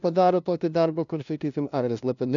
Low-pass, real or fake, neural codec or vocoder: 7.2 kHz; fake; codec, 16 kHz, 0.5 kbps, FunCodec, trained on LibriTTS, 25 frames a second